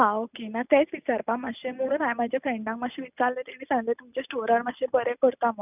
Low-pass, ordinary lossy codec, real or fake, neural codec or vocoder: 3.6 kHz; none; real; none